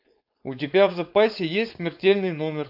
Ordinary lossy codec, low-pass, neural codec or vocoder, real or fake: AAC, 48 kbps; 5.4 kHz; codec, 16 kHz, 4.8 kbps, FACodec; fake